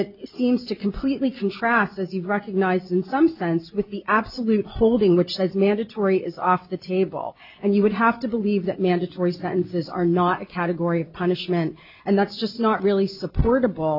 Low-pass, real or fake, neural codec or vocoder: 5.4 kHz; real; none